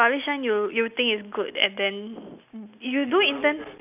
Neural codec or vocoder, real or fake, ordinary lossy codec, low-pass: none; real; none; 3.6 kHz